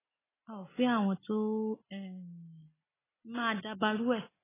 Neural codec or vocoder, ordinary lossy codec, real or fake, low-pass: none; AAC, 16 kbps; real; 3.6 kHz